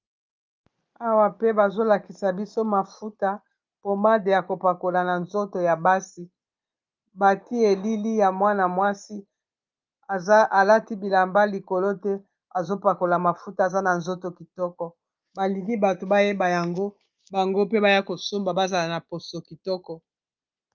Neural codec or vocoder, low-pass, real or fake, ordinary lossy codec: none; 7.2 kHz; real; Opus, 24 kbps